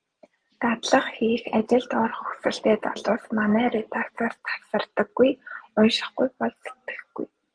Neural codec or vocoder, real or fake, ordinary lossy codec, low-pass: none; real; Opus, 24 kbps; 9.9 kHz